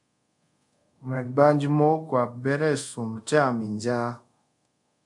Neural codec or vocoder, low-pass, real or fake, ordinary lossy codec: codec, 24 kHz, 0.5 kbps, DualCodec; 10.8 kHz; fake; MP3, 64 kbps